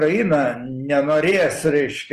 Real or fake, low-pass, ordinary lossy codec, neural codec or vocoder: real; 14.4 kHz; Opus, 24 kbps; none